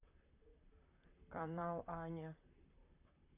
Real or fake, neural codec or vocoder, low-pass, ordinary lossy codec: fake; vocoder, 44.1 kHz, 128 mel bands, Pupu-Vocoder; 3.6 kHz; none